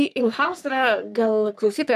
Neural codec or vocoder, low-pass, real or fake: codec, 44.1 kHz, 3.4 kbps, Pupu-Codec; 14.4 kHz; fake